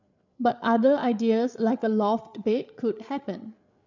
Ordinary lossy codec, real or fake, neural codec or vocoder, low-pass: none; fake; codec, 16 kHz, 8 kbps, FreqCodec, larger model; 7.2 kHz